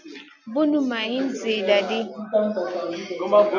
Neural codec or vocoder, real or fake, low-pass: none; real; 7.2 kHz